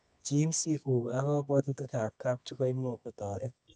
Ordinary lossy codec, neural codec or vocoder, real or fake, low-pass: none; codec, 24 kHz, 0.9 kbps, WavTokenizer, medium music audio release; fake; none